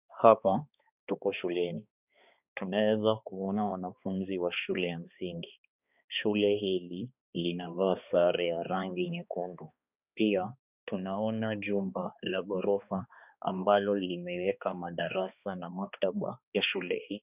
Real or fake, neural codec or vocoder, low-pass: fake; codec, 16 kHz, 2 kbps, X-Codec, HuBERT features, trained on balanced general audio; 3.6 kHz